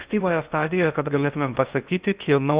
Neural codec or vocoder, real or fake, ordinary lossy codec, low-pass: codec, 16 kHz in and 24 kHz out, 0.6 kbps, FocalCodec, streaming, 2048 codes; fake; Opus, 64 kbps; 3.6 kHz